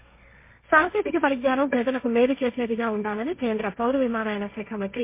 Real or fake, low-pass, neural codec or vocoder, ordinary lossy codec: fake; 3.6 kHz; codec, 16 kHz, 1.1 kbps, Voila-Tokenizer; MP3, 24 kbps